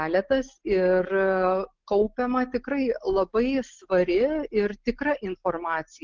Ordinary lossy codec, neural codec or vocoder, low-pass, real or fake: Opus, 16 kbps; none; 7.2 kHz; real